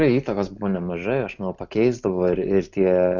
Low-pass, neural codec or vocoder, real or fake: 7.2 kHz; none; real